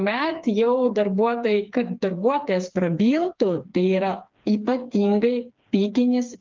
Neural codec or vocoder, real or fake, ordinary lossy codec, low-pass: codec, 16 kHz, 4 kbps, FreqCodec, smaller model; fake; Opus, 24 kbps; 7.2 kHz